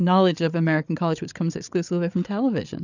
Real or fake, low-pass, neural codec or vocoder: fake; 7.2 kHz; codec, 16 kHz, 8 kbps, FreqCodec, larger model